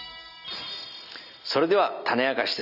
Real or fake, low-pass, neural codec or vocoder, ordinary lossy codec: real; 5.4 kHz; none; none